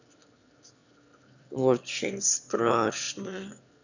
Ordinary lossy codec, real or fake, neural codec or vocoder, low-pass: none; fake; autoencoder, 22.05 kHz, a latent of 192 numbers a frame, VITS, trained on one speaker; 7.2 kHz